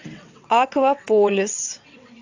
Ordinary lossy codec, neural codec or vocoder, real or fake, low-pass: AAC, 48 kbps; vocoder, 22.05 kHz, 80 mel bands, WaveNeXt; fake; 7.2 kHz